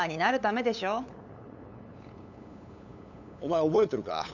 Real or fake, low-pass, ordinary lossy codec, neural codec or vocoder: fake; 7.2 kHz; none; codec, 16 kHz, 8 kbps, FunCodec, trained on LibriTTS, 25 frames a second